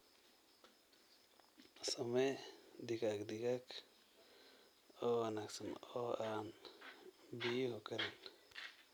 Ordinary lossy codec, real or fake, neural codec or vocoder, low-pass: none; real; none; none